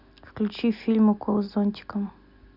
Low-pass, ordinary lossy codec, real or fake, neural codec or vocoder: 5.4 kHz; Opus, 64 kbps; real; none